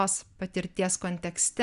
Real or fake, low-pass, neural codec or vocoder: real; 10.8 kHz; none